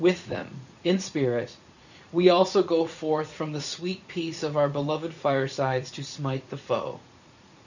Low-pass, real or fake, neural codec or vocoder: 7.2 kHz; fake; vocoder, 44.1 kHz, 80 mel bands, Vocos